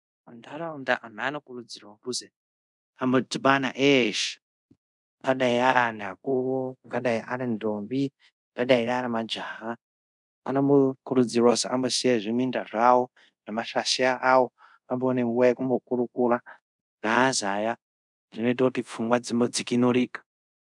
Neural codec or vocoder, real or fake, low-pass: codec, 24 kHz, 0.5 kbps, DualCodec; fake; 10.8 kHz